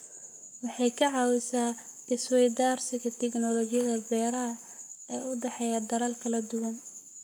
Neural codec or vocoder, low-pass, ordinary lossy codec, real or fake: codec, 44.1 kHz, 7.8 kbps, Pupu-Codec; none; none; fake